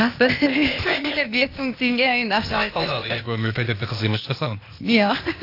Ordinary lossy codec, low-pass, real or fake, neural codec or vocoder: AAC, 32 kbps; 5.4 kHz; fake; codec, 16 kHz, 0.8 kbps, ZipCodec